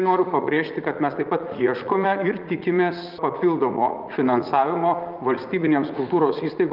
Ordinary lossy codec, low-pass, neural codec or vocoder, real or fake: Opus, 32 kbps; 5.4 kHz; vocoder, 44.1 kHz, 80 mel bands, Vocos; fake